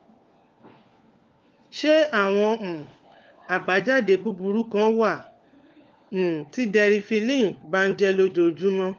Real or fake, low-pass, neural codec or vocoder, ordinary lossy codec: fake; 7.2 kHz; codec, 16 kHz, 4 kbps, FunCodec, trained on LibriTTS, 50 frames a second; Opus, 32 kbps